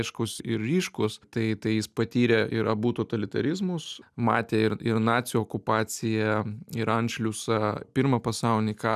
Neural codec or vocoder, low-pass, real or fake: none; 14.4 kHz; real